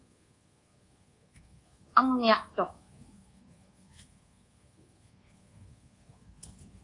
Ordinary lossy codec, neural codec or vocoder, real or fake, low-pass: AAC, 32 kbps; codec, 24 kHz, 1.2 kbps, DualCodec; fake; 10.8 kHz